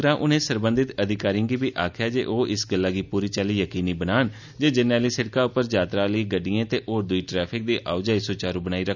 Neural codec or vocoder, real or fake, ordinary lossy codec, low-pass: none; real; none; 7.2 kHz